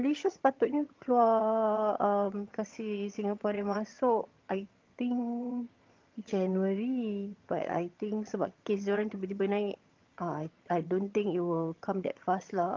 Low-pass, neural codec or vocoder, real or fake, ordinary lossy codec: 7.2 kHz; vocoder, 22.05 kHz, 80 mel bands, HiFi-GAN; fake; Opus, 24 kbps